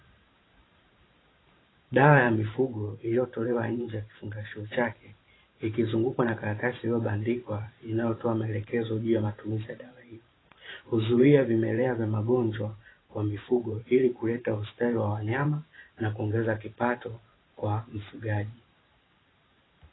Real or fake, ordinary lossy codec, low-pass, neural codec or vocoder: fake; AAC, 16 kbps; 7.2 kHz; vocoder, 44.1 kHz, 128 mel bands every 512 samples, BigVGAN v2